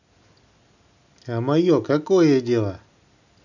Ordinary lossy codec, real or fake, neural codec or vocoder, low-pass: none; real; none; 7.2 kHz